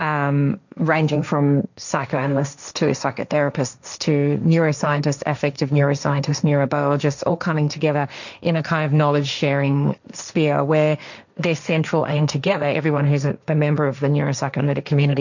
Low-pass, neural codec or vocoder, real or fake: 7.2 kHz; codec, 16 kHz, 1.1 kbps, Voila-Tokenizer; fake